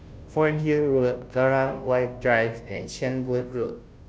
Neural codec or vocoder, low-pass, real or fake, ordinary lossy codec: codec, 16 kHz, 0.5 kbps, FunCodec, trained on Chinese and English, 25 frames a second; none; fake; none